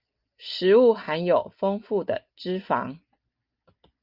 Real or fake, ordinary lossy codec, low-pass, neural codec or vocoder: real; Opus, 24 kbps; 5.4 kHz; none